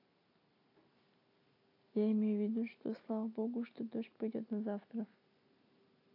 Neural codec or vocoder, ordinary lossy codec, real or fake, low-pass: none; AAC, 48 kbps; real; 5.4 kHz